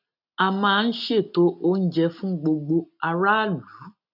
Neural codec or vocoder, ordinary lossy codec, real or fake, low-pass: none; none; real; 5.4 kHz